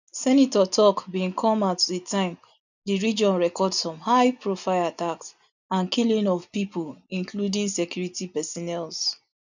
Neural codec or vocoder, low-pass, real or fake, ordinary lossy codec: none; 7.2 kHz; real; none